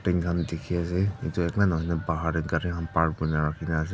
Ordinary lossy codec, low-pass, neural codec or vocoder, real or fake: none; none; none; real